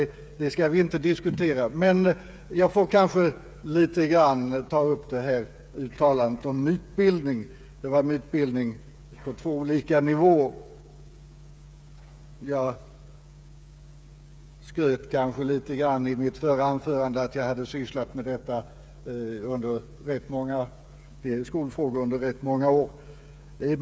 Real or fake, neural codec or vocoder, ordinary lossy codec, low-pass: fake; codec, 16 kHz, 8 kbps, FreqCodec, smaller model; none; none